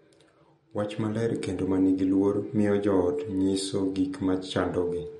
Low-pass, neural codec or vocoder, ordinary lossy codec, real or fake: 19.8 kHz; none; MP3, 48 kbps; real